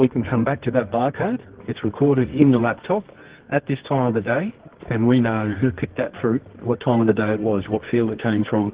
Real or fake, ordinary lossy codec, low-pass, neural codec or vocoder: fake; Opus, 16 kbps; 3.6 kHz; codec, 24 kHz, 0.9 kbps, WavTokenizer, medium music audio release